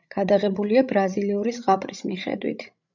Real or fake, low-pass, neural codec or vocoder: fake; 7.2 kHz; codec, 16 kHz, 16 kbps, FreqCodec, larger model